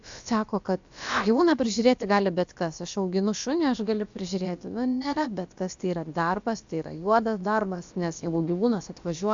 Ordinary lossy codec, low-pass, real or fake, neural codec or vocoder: AAC, 64 kbps; 7.2 kHz; fake; codec, 16 kHz, about 1 kbps, DyCAST, with the encoder's durations